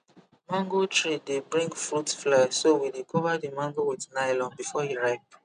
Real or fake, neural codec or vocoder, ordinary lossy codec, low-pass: real; none; none; 9.9 kHz